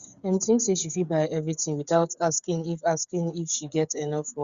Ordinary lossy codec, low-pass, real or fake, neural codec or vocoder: Opus, 64 kbps; 7.2 kHz; fake; codec, 16 kHz, 8 kbps, FreqCodec, smaller model